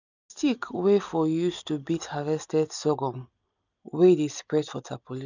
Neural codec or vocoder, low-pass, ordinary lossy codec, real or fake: none; 7.2 kHz; none; real